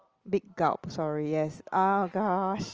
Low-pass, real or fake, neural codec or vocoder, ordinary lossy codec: 7.2 kHz; real; none; Opus, 24 kbps